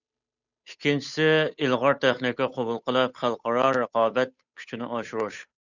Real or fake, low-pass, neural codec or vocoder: fake; 7.2 kHz; codec, 16 kHz, 8 kbps, FunCodec, trained on Chinese and English, 25 frames a second